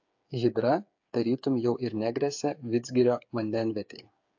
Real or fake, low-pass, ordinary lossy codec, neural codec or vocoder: fake; 7.2 kHz; AAC, 48 kbps; codec, 16 kHz, 8 kbps, FreqCodec, smaller model